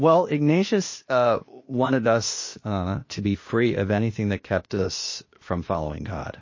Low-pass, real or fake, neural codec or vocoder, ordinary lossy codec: 7.2 kHz; fake; codec, 16 kHz, 0.8 kbps, ZipCodec; MP3, 32 kbps